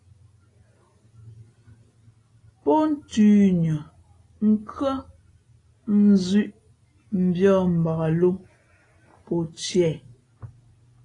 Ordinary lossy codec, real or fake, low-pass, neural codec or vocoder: AAC, 32 kbps; real; 10.8 kHz; none